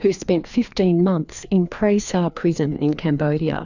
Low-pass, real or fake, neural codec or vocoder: 7.2 kHz; fake; codec, 16 kHz, 2 kbps, FreqCodec, larger model